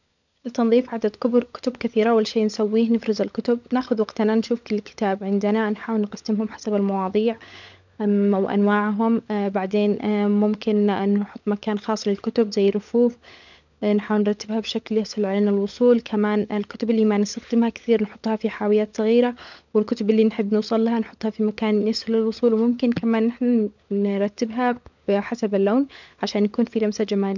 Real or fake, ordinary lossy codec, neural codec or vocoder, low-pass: fake; none; codec, 16 kHz, 16 kbps, FunCodec, trained on LibriTTS, 50 frames a second; 7.2 kHz